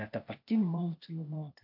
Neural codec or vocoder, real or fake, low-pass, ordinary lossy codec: codec, 16 kHz, 0.8 kbps, ZipCodec; fake; 5.4 kHz; MP3, 48 kbps